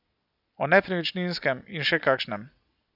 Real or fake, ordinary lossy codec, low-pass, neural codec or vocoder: real; none; 5.4 kHz; none